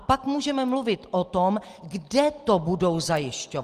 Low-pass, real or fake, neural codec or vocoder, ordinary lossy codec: 14.4 kHz; real; none; Opus, 24 kbps